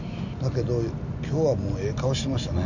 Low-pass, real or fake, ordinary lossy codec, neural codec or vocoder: 7.2 kHz; real; none; none